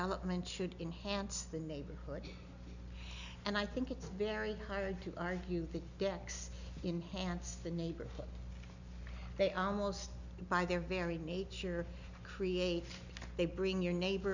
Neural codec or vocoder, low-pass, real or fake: none; 7.2 kHz; real